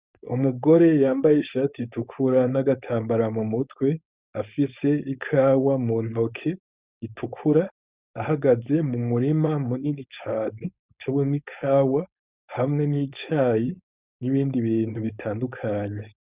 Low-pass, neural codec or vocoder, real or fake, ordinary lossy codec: 3.6 kHz; codec, 16 kHz, 4.8 kbps, FACodec; fake; Opus, 64 kbps